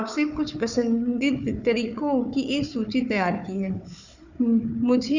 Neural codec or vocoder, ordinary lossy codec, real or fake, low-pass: codec, 16 kHz, 4 kbps, FunCodec, trained on Chinese and English, 50 frames a second; none; fake; 7.2 kHz